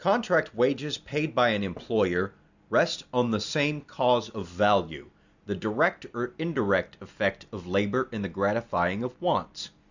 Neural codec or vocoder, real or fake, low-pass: none; real; 7.2 kHz